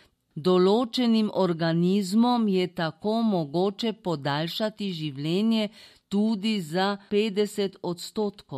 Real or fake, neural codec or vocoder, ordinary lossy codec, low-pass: real; none; MP3, 64 kbps; 14.4 kHz